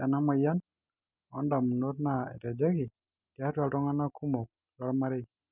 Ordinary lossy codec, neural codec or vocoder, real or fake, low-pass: none; none; real; 3.6 kHz